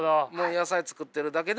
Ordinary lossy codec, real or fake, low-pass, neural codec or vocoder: none; real; none; none